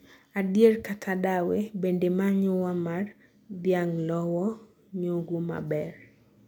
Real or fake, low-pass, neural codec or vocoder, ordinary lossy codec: real; 19.8 kHz; none; none